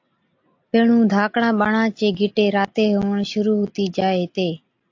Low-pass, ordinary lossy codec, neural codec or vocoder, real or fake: 7.2 kHz; AAC, 48 kbps; none; real